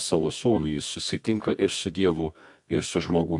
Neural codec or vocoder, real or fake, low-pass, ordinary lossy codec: codec, 24 kHz, 0.9 kbps, WavTokenizer, medium music audio release; fake; 10.8 kHz; MP3, 96 kbps